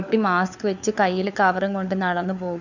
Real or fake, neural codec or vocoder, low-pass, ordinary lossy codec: fake; codec, 16 kHz, 4 kbps, FunCodec, trained on LibriTTS, 50 frames a second; 7.2 kHz; none